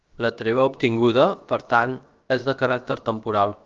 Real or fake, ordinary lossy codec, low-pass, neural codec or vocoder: fake; Opus, 24 kbps; 7.2 kHz; codec, 16 kHz, 0.7 kbps, FocalCodec